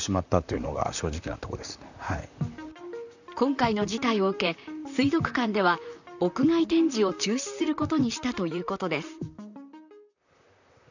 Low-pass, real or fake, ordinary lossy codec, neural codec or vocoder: 7.2 kHz; fake; none; vocoder, 44.1 kHz, 128 mel bands, Pupu-Vocoder